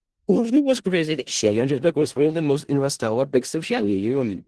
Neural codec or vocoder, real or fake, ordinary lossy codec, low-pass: codec, 16 kHz in and 24 kHz out, 0.4 kbps, LongCat-Audio-Codec, four codebook decoder; fake; Opus, 16 kbps; 10.8 kHz